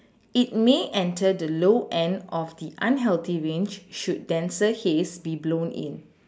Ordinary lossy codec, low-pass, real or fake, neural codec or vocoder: none; none; real; none